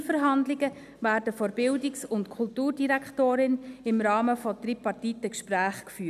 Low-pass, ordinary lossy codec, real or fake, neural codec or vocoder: 14.4 kHz; none; real; none